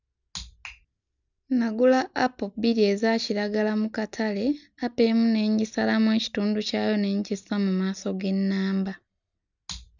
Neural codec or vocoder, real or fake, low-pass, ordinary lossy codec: none; real; 7.2 kHz; none